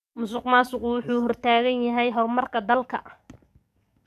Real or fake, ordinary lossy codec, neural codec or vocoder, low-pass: fake; none; codec, 44.1 kHz, 7.8 kbps, DAC; 14.4 kHz